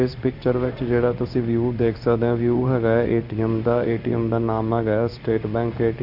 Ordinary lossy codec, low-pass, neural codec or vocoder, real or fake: none; 5.4 kHz; codec, 16 kHz in and 24 kHz out, 1 kbps, XY-Tokenizer; fake